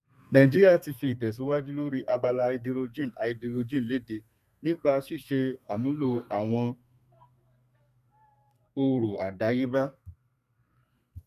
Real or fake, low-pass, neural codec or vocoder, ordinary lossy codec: fake; 14.4 kHz; codec, 32 kHz, 1.9 kbps, SNAC; none